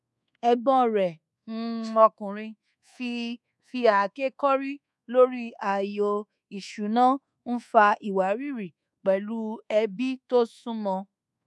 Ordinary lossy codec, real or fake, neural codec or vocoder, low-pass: none; fake; codec, 24 kHz, 1.2 kbps, DualCodec; none